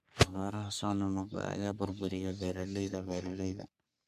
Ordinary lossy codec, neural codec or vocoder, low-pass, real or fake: MP3, 96 kbps; codec, 44.1 kHz, 3.4 kbps, Pupu-Codec; 14.4 kHz; fake